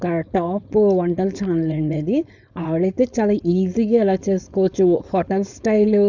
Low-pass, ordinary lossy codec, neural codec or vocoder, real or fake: 7.2 kHz; none; codec, 16 kHz, 4.8 kbps, FACodec; fake